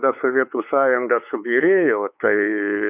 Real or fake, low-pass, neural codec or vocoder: fake; 3.6 kHz; codec, 16 kHz, 4 kbps, X-Codec, WavLM features, trained on Multilingual LibriSpeech